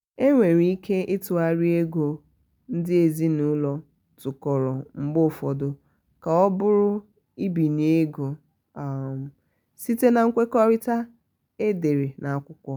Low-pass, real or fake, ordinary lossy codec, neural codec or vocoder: none; real; none; none